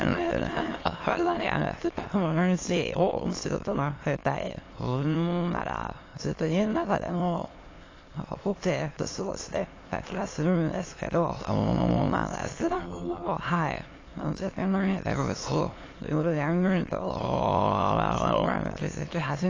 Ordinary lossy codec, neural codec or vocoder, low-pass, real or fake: AAC, 32 kbps; autoencoder, 22.05 kHz, a latent of 192 numbers a frame, VITS, trained on many speakers; 7.2 kHz; fake